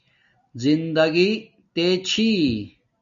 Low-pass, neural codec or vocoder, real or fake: 7.2 kHz; none; real